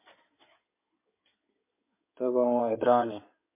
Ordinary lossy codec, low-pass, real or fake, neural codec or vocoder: MP3, 24 kbps; 3.6 kHz; fake; codec, 16 kHz in and 24 kHz out, 2.2 kbps, FireRedTTS-2 codec